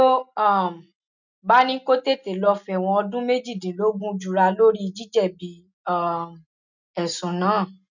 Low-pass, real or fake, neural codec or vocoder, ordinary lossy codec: 7.2 kHz; real; none; none